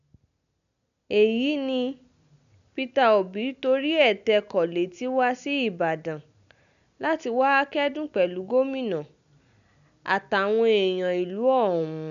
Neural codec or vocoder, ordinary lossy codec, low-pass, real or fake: none; none; 7.2 kHz; real